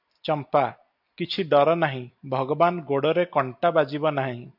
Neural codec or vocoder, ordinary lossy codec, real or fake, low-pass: none; AAC, 48 kbps; real; 5.4 kHz